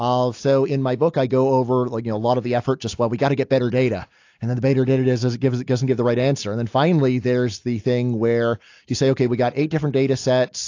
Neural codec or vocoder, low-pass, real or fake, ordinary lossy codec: none; 7.2 kHz; real; AAC, 48 kbps